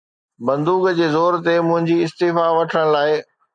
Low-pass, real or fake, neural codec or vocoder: 9.9 kHz; real; none